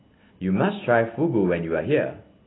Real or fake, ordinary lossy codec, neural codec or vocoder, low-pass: real; AAC, 16 kbps; none; 7.2 kHz